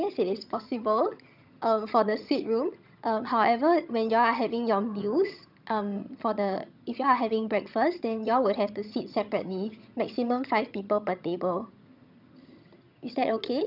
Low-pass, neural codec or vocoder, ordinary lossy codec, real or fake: 5.4 kHz; vocoder, 22.05 kHz, 80 mel bands, HiFi-GAN; none; fake